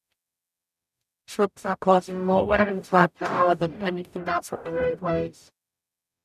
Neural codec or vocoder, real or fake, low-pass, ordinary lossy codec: codec, 44.1 kHz, 0.9 kbps, DAC; fake; 14.4 kHz; none